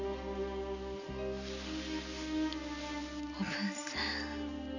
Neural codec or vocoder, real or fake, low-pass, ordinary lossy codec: none; real; 7.2 kHz; none